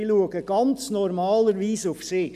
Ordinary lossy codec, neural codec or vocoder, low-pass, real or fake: none; none; 14.4 kHz; real